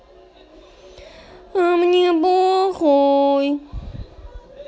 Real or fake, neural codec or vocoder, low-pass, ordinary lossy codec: real; none; none; none